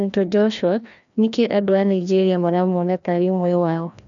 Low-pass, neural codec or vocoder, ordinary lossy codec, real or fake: 7.2 kHz; codec, 16 kHz, 1 kbps, FreqCodec, larger model; none; fake